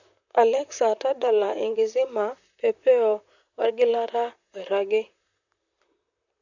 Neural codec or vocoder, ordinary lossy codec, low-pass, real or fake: vocoder, 44.1 kHz, 128 mel bands, Pupu-Vocoder; none; 7.2 kHz; fake